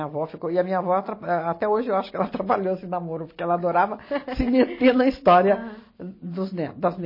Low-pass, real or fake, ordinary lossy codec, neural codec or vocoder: 5.4 kHz; real; MP3, 24 kbps; none